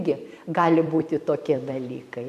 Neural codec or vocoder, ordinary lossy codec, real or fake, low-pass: vocoder, 44.1 kHz, 128 mel bands every 256 samples, BigVGAN v2; MP3, 96 kbps; fake; 14.4 kHz